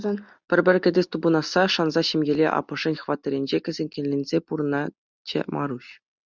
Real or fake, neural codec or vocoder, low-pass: real; none; 7.2 kHz